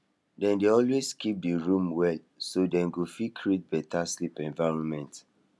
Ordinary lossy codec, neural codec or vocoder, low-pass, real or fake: none; none; none; real